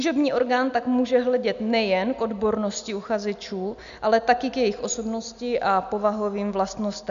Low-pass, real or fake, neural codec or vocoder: 7.2 kHz; real; none